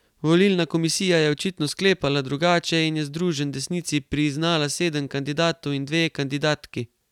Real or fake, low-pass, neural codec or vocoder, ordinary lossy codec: real; 19.8 kHz; none; none